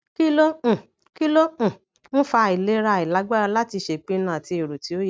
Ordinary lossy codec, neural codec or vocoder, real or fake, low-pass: none; none; real; none